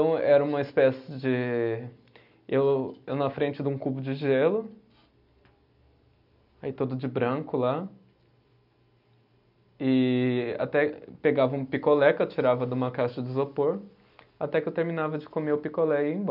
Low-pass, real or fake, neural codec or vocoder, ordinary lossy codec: 5.4 kHz; real; none; none